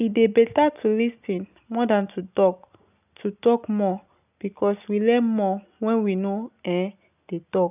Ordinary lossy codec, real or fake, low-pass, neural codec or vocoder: none; real; 3.6 kHz; none